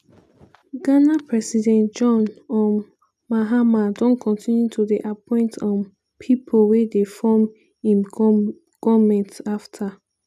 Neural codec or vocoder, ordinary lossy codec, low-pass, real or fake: none; none; 14.4 kHz; real